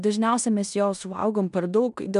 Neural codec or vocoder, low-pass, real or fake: codec, 16 kHz in and 24 kHz out, 0.9 kbps, LongCat-Audio-Codec, four codebook decoder; 10.8 kHz; fake